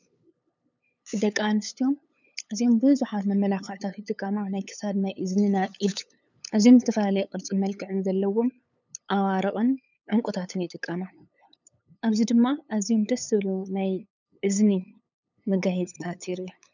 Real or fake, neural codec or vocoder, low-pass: fake; codec, 16 kHz, 8 kbps, FunCodec, trained on LibriTTS, 25 frames a second; 7.2 kHz